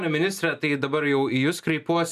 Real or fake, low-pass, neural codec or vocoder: real; 14.4 kHz; none